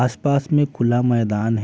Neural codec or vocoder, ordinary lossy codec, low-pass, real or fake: none; none; none; real